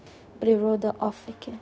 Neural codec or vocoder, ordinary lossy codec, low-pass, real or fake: codec, 16 kHz, 0.4 kbps, LongCat-Audio-Codec; none; none; fake